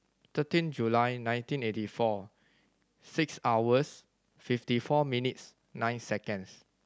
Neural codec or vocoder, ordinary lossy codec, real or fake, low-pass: none; none; real; none